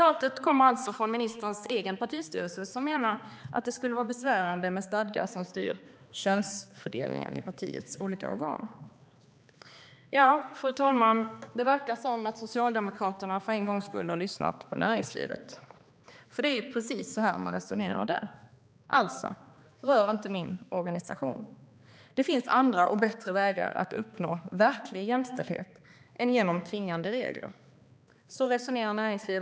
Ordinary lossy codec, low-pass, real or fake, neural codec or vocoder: none; none; fake; codec, 16 kHz, 2 kbps, X-Codec, HuBERT features, trained on balanced general audio